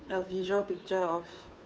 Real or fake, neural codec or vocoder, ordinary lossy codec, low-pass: fake; codec, 16 kHz, 2 kbps, FunCodec, trained on Chinese and English, 25 frames a second; none; none